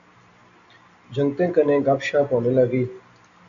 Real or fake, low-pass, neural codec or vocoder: real; 7.2 kHz; none